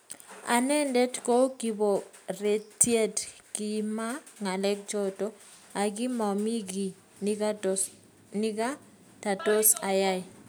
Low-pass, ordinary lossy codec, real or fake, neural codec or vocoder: none; none; real; none